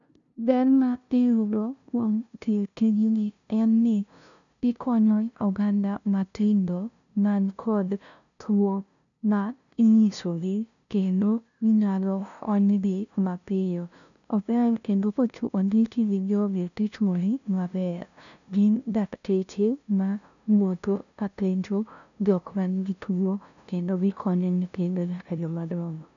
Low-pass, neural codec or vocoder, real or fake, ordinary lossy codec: 7.2 kHz; codec, 16 kHz, 0.5 kbps, FunCodec, trained on LibriTTS, 25 frames a second; fake; none